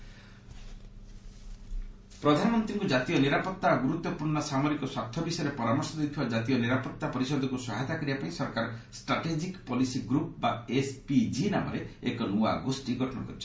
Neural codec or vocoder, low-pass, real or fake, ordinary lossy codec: none; none; real; none